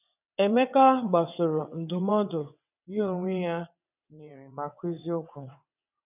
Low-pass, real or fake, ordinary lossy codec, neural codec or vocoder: 3.6 kHz; fake; none; vocoder, 22.05 kHz, 80 mel bands, WaveNeXt